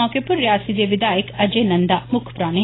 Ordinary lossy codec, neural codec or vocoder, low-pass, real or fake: AAC, 16 kbps; none; 7.2 kHz; real